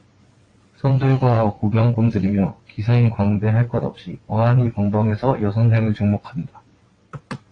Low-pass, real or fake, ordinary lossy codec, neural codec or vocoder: 9.9 kHz; fake; AAC, 32 kbps; vocoder, 22.05 kHz, 80 mel bands, WaveNeXt